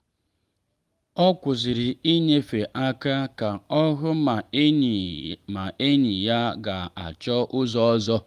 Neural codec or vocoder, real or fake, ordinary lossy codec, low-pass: none; real; Opus, 32 kbps; 14.4 kHz